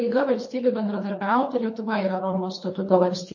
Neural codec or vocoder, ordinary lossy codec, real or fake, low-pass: codec, 24 kHz, 3 kbps, HILCodec; MP3, 32 kbps; fake; 7.2 kHz